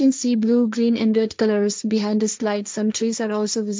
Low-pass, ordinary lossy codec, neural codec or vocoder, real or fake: none; none; codec, 16 kHz, 1.1 kbps, Voila-Tokenizer; fake